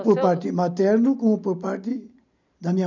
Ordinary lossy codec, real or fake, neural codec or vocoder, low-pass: none; real; none; 7.2 kHz